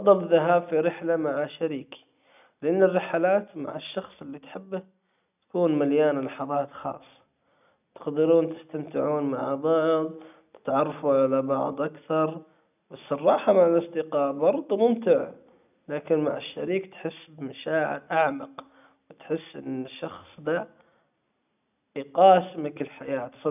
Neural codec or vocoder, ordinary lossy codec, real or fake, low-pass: none; none; real; 3.6 kHz